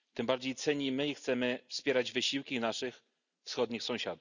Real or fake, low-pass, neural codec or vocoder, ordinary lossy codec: real; 7.2 kHz; none; none